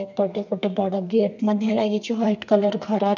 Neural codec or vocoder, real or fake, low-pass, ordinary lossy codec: codec, 32 kHz, 1.9 kbps, SNAC; fake; 7.2 kHz; none